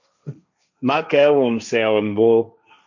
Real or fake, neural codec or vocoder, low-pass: fake; codec, 16 kHz, 1.1 kbps, Voila-Tokenizer; 7.2 kHz